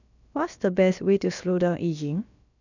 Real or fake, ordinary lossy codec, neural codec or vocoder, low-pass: fake; none; codec, 16 kHz, about 1 kbps, DyCAST, with the encoder's durations; 7.2 kHz